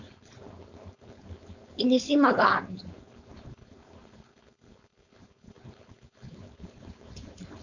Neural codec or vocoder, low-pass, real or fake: codec, 16 kHz, 4.8 kbps, FACodec; 7.2 kHz; fake